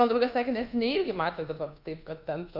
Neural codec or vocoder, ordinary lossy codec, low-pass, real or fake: codec, 24 kHz, 1.2 kbps, DualCodec; Opus, 24 kbps; 5.4 kHz; fake